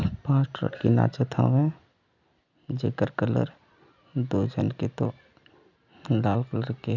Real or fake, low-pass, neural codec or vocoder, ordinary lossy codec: real; 7.2 kHz; none; none